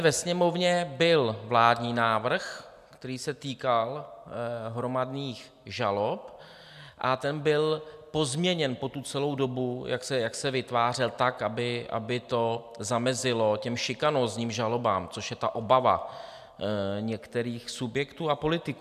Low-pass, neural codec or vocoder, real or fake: 14.4 kHz; none; real